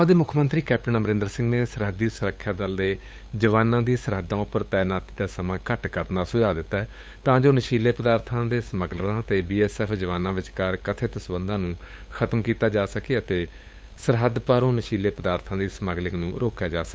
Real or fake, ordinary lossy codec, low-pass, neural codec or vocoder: fake; none; none; codec, 16 kHz, 8 kbps, FunCodec, trained on LibriTTS, 25 frames a second